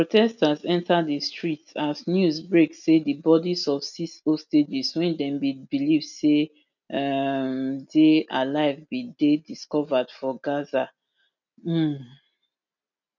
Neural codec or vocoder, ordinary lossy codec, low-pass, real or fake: none; none; 7.2 kHz; real